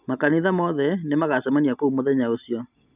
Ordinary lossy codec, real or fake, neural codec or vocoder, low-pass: none; real; none; 3.6 kHz